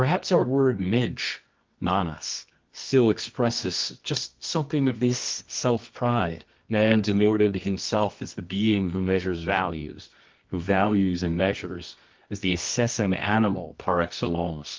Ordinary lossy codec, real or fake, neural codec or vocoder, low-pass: Opus, 24 kbps; fake; codec, 24 kHz, 0.9 kbps, WavTokenizer, medium music audio release; 7.2 kHz